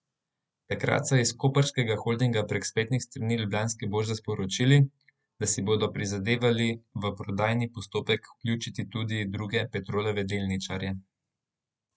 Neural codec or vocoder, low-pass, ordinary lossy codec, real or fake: none; none; none; real